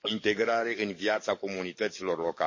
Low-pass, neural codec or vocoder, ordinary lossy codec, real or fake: 7.2 kHz; codec, 44.1 kHz, 7.8 kbps, DAC; MP3, 32 kbps; fake